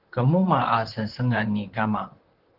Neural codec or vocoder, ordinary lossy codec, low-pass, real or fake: vocoder, 44.1 kHz, 128 mel bands, Pupu-Vocoder; Opus, 16 kbps; 5.4 kHz; fake